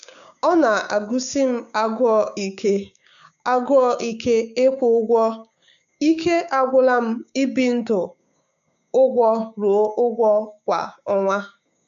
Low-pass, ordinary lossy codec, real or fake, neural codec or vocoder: 7.2 kHz; none; fake; codec, 16 kHz, 6 kbps, DAC